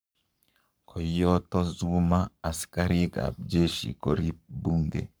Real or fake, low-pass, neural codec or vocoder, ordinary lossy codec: fake; none; codec, 44.1 kHz, 7.8 kbps, Pupu-Codec; none